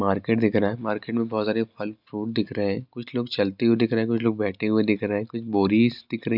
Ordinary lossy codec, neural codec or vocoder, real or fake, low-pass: none; none; real; 5.4 kHz